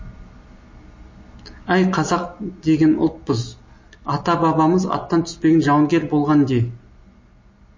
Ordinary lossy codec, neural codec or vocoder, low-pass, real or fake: MP3, 32 kbps; none; 7.2 kHz; real